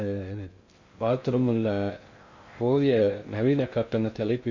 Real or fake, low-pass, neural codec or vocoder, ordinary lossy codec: fake; 7.2 kHz; codec, 16 kHz in and 24 kHz out, 0.6 kbps, FocalCodec, streaming, 2048 codes; MP3, 48 kbps